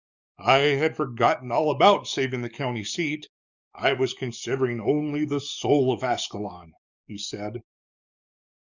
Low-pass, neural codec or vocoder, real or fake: 7.2 kHz; codec, 44.1 kHz, 7.8 kbps, DAC; fake